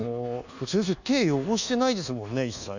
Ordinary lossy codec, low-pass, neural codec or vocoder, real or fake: none; 7.2 kHz; codec, 24 kHz, 1.2 kbps, DualCodec; fake